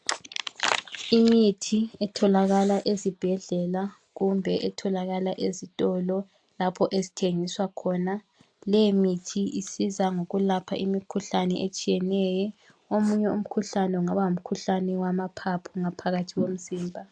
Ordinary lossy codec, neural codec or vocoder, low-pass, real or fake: AAC, 64 kbps; none; 9.9 kHz; real